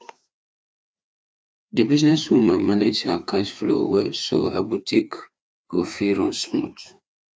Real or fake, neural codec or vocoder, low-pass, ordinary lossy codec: fake; codec, 16 kHz, 2 kbps, FreqCodec, larger model; none; none